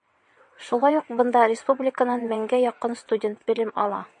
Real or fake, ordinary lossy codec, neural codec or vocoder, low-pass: fake; MP3, 48 kbps; vocoder, 22.05 kHz, 80 mel bands, WaveNeXt; 9.9 kHz